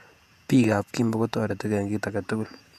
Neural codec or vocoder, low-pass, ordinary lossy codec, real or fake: vocoder, 48 kHz, 128 mel bands, Vocos; 14.4 kHz; AAC, 96 kbps; fake